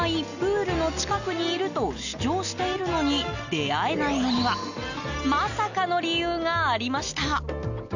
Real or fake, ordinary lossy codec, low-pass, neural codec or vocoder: real; none; 7.2 kHz; none